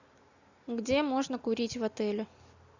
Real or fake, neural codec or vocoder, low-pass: real; none; 7.2 kHz